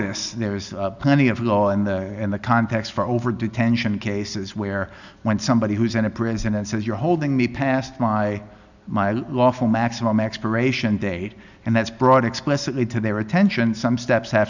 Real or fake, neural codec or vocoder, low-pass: real; none; 7.2 kHz